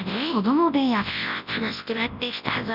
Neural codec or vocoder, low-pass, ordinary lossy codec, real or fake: codec, 24 kHz, 0.9 kbps, WavTokenizer, large speech release; 5.4 kHz; none; fake